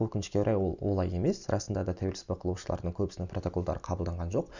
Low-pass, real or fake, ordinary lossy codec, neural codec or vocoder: 7.2 kHz; real; none; none